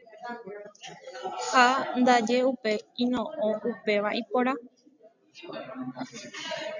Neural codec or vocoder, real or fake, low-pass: none; real; 7.2 kHz